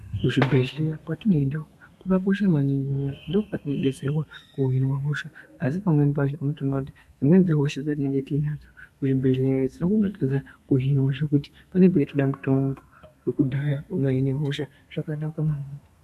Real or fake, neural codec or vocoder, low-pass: fake; codec, 32 kHz, 1.9 kbps, SNAC; 14.4 kHz